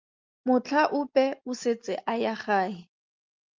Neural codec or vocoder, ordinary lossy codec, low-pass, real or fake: none; Opus, 24 kbps; 7.2 kHz; real